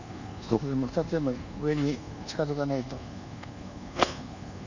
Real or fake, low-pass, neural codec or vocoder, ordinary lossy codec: fake; 7.2 kHz; codec, 24 kHz, 1.2 kbps, DualCodec; none